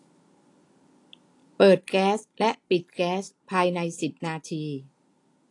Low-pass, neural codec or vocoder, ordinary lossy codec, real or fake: 10.8 kHz; none; AAC, 48 kbps; real